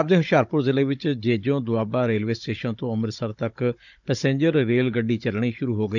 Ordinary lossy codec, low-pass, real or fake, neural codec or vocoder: none; 7.2 kHz; fake; codec, 16 kHz, 16 kbps, FunCodec, trained on Chinese and English, 50 frames a second